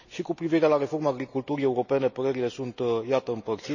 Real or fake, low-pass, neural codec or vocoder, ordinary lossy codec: real; 7.2 kHz; none; none